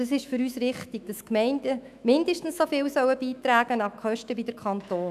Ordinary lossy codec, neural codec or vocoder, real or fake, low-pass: none; autoencoder, 48 kHz, 128 numbers a frame, DAC-VAE, trained on Japanese speech; fake; 14.4 kHz